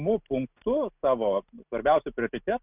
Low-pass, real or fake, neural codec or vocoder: 3.6 kHz; real; none